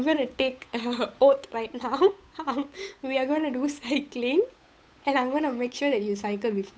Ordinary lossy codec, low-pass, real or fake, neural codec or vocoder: none; none; fake; codec, 16 kHz, 8 kbps, FunCodec, trained on Chinese and English, 25 frames a second